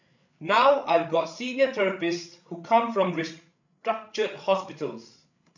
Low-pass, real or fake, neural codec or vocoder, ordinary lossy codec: 7.2 kHz; fake; codec, 16 kHz, 8 kbps, FreqCodec, larger model; none